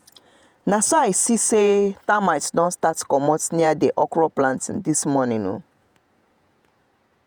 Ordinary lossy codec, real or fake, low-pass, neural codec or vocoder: none; fake; none; vocoder, 48 kHz, 128 mel bands, Vocos